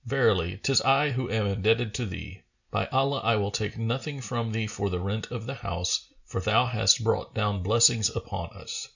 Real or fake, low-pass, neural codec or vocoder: real; 7.2 kHz; none